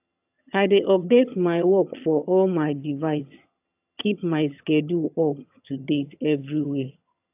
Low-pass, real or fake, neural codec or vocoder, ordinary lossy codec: 3.6 kHz; fake; vocoder, 22.05 kHz, 80 mel bands, HiFi-GAN; AAC, 32 kbps